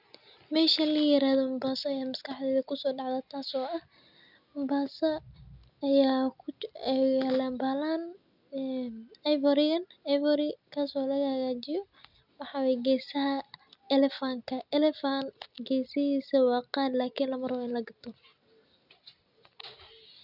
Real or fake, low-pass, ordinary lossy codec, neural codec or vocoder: real; 5.4 kHz; none; none